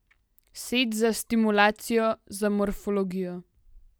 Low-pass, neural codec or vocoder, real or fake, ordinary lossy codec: none; none; real; none